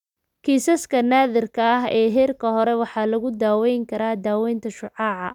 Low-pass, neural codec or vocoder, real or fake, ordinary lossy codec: 19.8 kHz; none; real; none